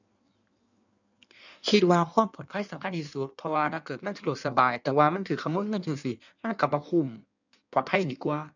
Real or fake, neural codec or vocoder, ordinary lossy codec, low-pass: fake; codec, 16 kHz in and 24 kHz out, 1.1 kbps, FireRedTTS-2 codec; none; 7.2 kHz